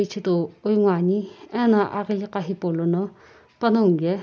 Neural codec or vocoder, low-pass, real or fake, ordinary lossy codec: none; none; real; none